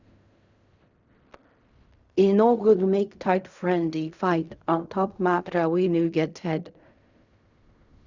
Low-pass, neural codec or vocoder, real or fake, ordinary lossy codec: 7.2 kHz; codec, 16 kHz in and 24 kHz out, 0.4 kbps, LongCat-Audio-Codec, fine tuned four codebook decoder; fake; Opus, 64 kbps